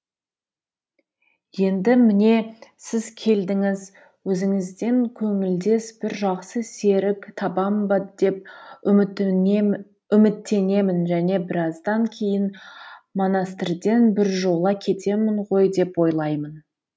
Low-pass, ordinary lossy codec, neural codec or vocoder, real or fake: none; none; none; real